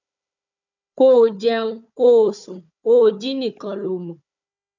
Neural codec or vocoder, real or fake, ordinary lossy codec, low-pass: codec, 16 kHz, 4 kbps, FunCodec, trained on Chinese and English, 50 frames a second; fake; none; 7.2 kHz